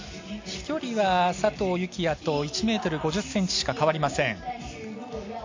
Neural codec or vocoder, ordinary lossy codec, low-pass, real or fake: none; MP3, 64 kbps; 7.2 kHz; real